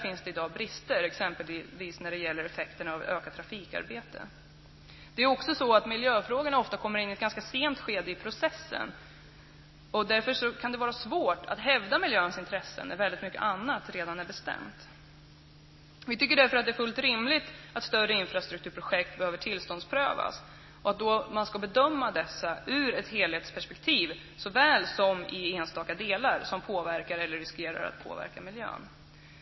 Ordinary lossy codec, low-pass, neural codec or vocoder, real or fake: MP3, 24 kbps; 7.2 kHz; none; real